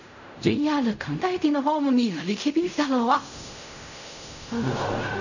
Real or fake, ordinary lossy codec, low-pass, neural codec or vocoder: fake; none; 7.2 kHz; codec, 16 kHz in and 24 kHz out, 0.4 kbps, LongCat-Audio-Codec, fine tuned four codebook decoder